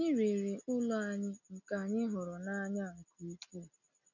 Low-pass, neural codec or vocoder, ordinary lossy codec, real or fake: 7.2 kHz; none; none; real